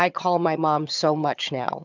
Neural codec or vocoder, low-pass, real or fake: vocoder, 22.05 kHz, 80 mel bands, HiFi-GAN; 7.2 kHz; fake